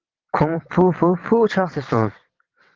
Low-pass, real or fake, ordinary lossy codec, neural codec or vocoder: 7.2 kHz; fake; Opus, 16 kbps; vocoder, 22.05 kHz, 80 mel bands, WaveNeXt